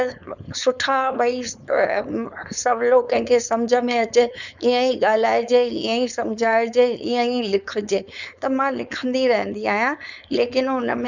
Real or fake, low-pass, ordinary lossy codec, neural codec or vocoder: fake; 7.2 kHz; none; codec, 16 kHz, 4.8 kbps, FACodec